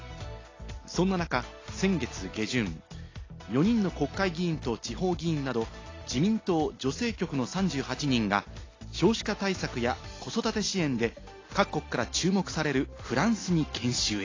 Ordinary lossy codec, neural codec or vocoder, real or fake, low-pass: AAC, 32 kbps; none; real; 7.2 kHz